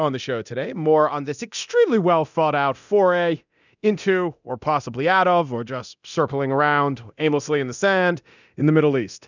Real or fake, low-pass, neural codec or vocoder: fake; 7.2 kHz; codec, 24 kHz, 0.9 kbps, DualCodec